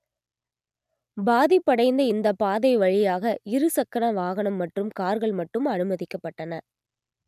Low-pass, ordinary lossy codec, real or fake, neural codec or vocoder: 14.4 kHz; none; real; none